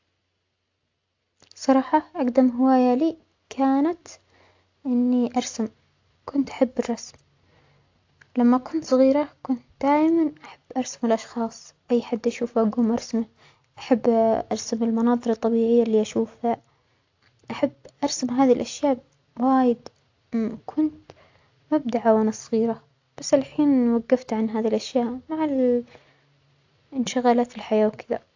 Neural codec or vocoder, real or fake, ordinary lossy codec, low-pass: none; real; AAC, 48 kbps; 7.2 kHz